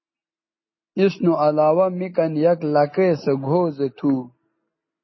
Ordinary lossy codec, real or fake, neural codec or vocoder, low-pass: MP3, 24 kbps; real; none; 7.2 kHz